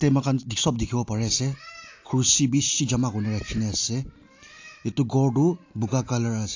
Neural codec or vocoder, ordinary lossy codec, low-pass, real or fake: none; AAC, 48 kbps; 7.2 kHz; real